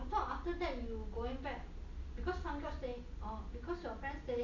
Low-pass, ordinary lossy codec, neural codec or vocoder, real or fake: 7.2 kHz; none; none; real